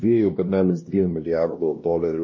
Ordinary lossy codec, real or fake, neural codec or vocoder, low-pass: MP3, 32 kbps; fake; codec, 16 kHz, 1 kbps, X-Codec, WavLM features, trained on Multilingual LibriSpeech; 7.2 kHz